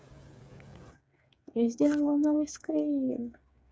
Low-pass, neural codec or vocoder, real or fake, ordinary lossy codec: none; codec, 16 kHz, 8 kbps, FreqCodec, smaller model; fake; none